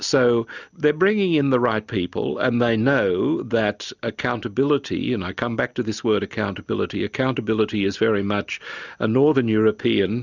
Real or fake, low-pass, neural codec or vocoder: real; 7.2 kHz; none